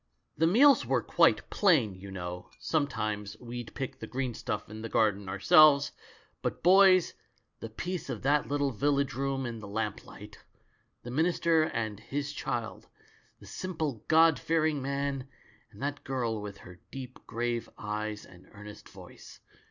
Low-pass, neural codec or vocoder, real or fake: 7.2 kHz; none; real